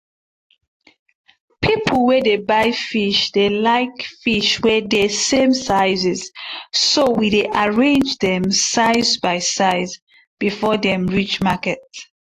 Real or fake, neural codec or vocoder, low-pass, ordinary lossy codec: real; none; 14.4 kHz; AAC, 48 kbps